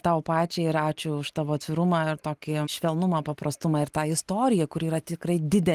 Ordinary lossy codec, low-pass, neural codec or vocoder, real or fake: Opus, 24 kbps; 14.4 kHz; none; real